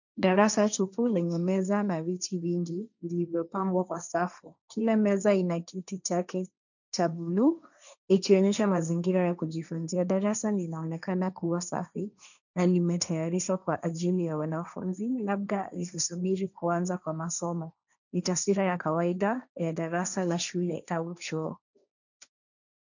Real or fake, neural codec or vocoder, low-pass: fake; codec, 16 kHz, 1.1 kbps, Voila-Tokenizer; 7.2 kHz